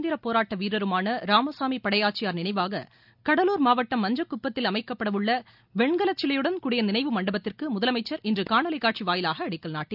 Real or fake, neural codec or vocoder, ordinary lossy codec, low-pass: real; none; none; 5.4 kHz